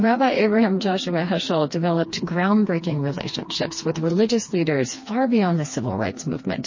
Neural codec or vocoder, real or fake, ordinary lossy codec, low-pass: codec, 16 kHz, 2 kbps, FreqCodec, smaller model; fake; MP3, 32 kbps; 7.2 kHz